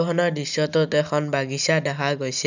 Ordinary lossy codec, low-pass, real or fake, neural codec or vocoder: none; 7.2 kHz; real; none